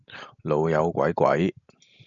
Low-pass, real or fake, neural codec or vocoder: 7.2 kHz; real; none